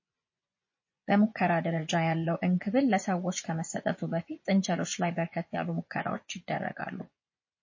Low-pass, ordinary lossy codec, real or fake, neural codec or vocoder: 7.2 kHz; MP3, 32 kbps; fake; vocoder, 24 kHz, 100 mel bands, Vocos